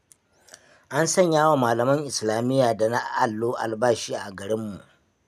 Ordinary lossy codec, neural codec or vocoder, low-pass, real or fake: none; none; 14.4 kHz; real